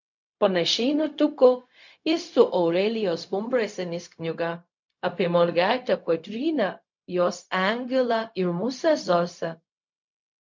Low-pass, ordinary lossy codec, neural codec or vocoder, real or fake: 7.2 kHz; MP3, 48 kbps; codec, 16 kHz, 0.4 kbps, LongCat-Audio-Codec; fake